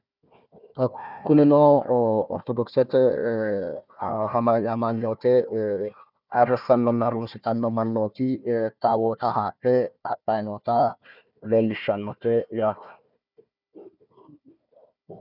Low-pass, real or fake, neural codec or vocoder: 5.4 kHz; fake; codec, 16 kHz, 1 kbps, FunCodec, trained on Chinese and English, 50 frames a second